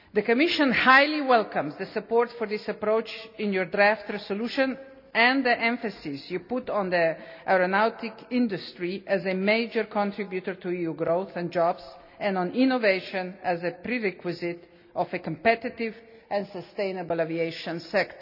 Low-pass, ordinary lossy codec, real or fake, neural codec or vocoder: 5.4 kHz; none; real; none